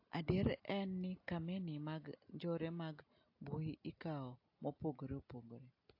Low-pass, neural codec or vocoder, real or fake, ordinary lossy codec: 5.4 kHz; none; real; AAC, 48 kbps